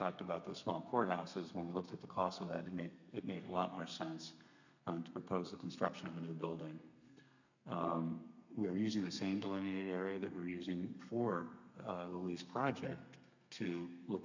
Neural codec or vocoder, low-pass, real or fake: codec, 32 kHz, 1.9 kbps, SNAC; 7.2 kHz; fake